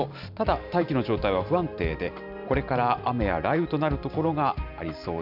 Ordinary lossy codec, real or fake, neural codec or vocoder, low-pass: none; fake; vocoder, 44.1 kHz, 128 mel bands every 512 samples, BigVGAN v2; 5.4 kHz